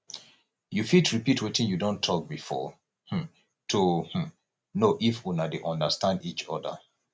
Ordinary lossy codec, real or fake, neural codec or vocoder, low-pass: none; real; none; none